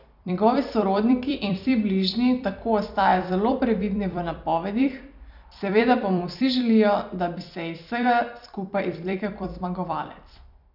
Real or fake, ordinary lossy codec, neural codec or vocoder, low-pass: fake; none; vocoder, 44.1 kHz, 128 mel bands every 256 samples, BigVGAN v2; 5.4 kHz